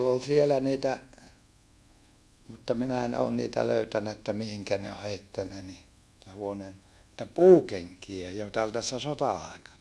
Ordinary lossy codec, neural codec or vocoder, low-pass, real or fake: none; codec, 24 kHz, 1.2 kbps, DualCodec; none; fake